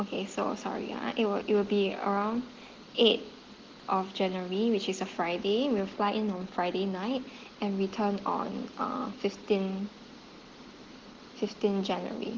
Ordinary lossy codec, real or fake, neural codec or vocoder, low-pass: Opus, 16 kbps; real; none; 7.2 kHz